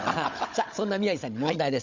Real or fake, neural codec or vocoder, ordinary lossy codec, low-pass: fake; codec, 16 kHz, 16 kbps, FunCodec, trained on Chinese and English, 50 frames a second; Opus, 64 kbps; 7.2 kHz